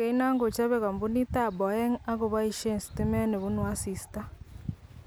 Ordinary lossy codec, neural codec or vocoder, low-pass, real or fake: none; none; none; real